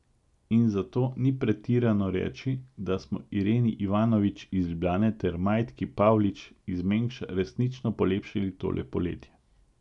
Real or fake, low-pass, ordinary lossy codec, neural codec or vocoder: real; 10.8 kHz; none; none